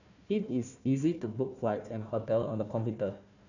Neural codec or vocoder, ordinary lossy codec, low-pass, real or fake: codec, 16 kHz, 1 kbps, FunCodec, trained on Chinese and English, 50 frames a second; none; 7.2 kHz; fake